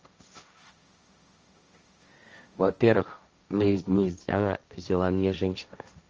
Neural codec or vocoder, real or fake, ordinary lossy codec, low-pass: codec, 16 kHz, 1.1 kbps, Voila-Tokenizer; fake; Opus, 24 kbps; 7.2 kHz